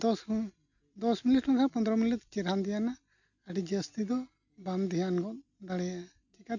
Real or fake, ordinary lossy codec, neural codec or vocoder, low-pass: real; none; none; 7.2 kHz